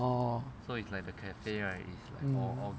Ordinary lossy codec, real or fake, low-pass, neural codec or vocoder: none; real; none; none